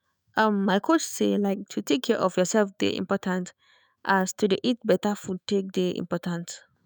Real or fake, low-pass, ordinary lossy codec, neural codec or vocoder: fake; none; none; autoencoder, 48 kHz, 128 numbers a frame, DAC-VAE, trained on Japanese speech